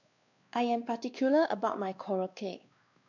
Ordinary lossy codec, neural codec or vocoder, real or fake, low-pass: none; codec, 16 kHz, 2 kbps, X-Codec, WavLM features, trained on Multilingual LibriSpeech; fake; 7.2 kHz